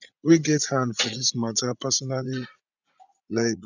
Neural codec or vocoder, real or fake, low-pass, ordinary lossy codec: vocoder, 44.1 kHz, 80 mel bands, Vocos; fake; 7.2 kHz; none